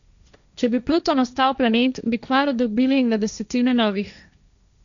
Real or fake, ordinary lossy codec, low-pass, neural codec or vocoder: fake; none; 7.2 kHz; codec, 16 kHz, 1.1 kbps, Voila-Tokenizer